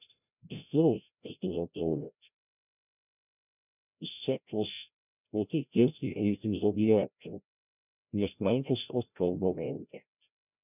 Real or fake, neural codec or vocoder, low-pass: fake; codec, 16 kHz, 0.5 kbps, FreqCodec, larger model; 3.6 kHz